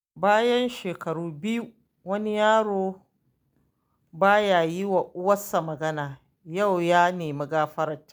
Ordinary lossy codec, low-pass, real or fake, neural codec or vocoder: none; none; real; none